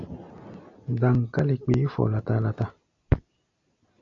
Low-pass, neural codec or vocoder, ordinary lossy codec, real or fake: 7.2 kHz; none; AAC, 48 kbps; real